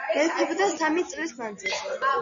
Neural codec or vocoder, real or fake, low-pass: none; real; 7.2 kHz